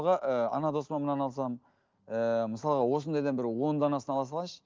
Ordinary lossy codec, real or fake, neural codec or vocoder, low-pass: Opus, 32 kbps; real; none; 7.2 kHz